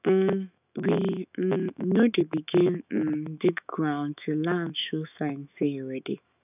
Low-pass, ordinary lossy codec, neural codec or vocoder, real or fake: 3.6 kHz; none; autoencoder, 48 kHz, 128 numbers a frame, DAC-VAE, trained on Japanese speech; fake